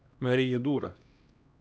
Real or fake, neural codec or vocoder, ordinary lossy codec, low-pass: fake; codec, 16 kHz, 1 kbps, X-Codec, HuBERT features, trained on LibriSpeech; none; none